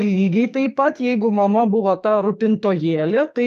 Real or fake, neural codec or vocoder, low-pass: fake; codec, 44.1 kHz, 2.6 kbps, SNAC; 14.4 kHz